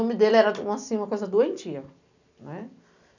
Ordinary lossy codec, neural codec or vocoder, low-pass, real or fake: none; none; 7.2 kHz; real